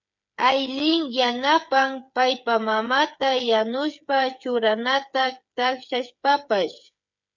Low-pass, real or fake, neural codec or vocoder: 7.2 kHz; fake; codec, 16 kHz, 8 kbps, FreqCodec, smaller model